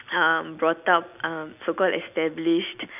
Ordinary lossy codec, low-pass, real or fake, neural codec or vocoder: none; 3.6 kHz; real; none